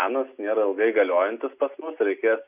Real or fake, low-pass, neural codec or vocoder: real; 3.6 kHz; none